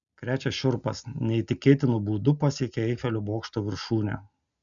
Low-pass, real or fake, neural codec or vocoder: 7.2 kHz; real; none